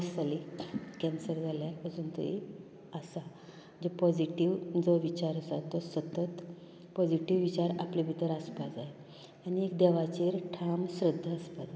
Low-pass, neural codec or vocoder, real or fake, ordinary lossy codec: none; none; real; none